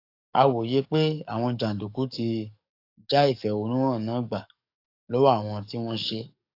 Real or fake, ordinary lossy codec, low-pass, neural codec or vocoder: fake; AAC, 32 kbps; 5.4 kHz; codec, 44.1 kHz, 7.8 kbps, DAC